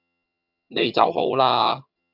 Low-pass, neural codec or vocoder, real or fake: 5.4 kHz; vocoder, 22.05 kHz, 80 mel bands, HiFi-GAN; fake